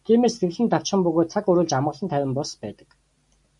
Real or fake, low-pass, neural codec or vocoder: real; 10.8 kHz; none